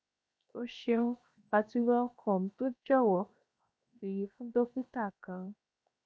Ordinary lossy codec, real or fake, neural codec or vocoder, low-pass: none; fake; codec, 16 kHz, 0.7 kbps, FocalCodec; none